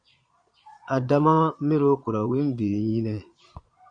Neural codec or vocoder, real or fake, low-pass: vocoder, 22.05 kHz, 80 mel bands, Vocos; fake; 9.9 kHz